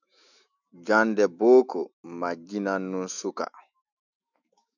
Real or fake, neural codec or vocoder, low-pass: fake; autoencoder, 48 kHz, 128 numbers a frame, DAC-VAE, trained on Japanese speech; 7.2 kHz